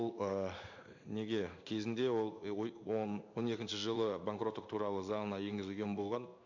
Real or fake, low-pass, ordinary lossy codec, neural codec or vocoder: fake; 7.2 kHz; none; codec, 16 kHz in and 24 kHz out, 1 kbps, XY-Tokenizer